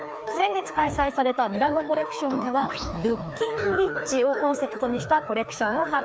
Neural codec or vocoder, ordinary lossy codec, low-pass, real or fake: codec, 16 kHz, 2 kbps, FreqCodec, larger model; none; none; fake